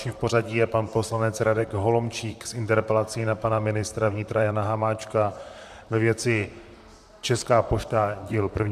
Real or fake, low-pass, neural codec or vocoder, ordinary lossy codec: fake; 14.4 kHz; vocoder, 44.1 kHz, 128 mel bands, Pupu-Vocoder; MP3, 96 kbps